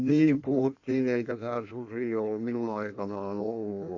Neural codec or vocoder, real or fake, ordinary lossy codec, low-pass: codec, 16 kHz in and 24 kHz out, 1.1 kbps, FireRedTTS-2 codec; fake; AAC, 48 kbps; 7.2 kHz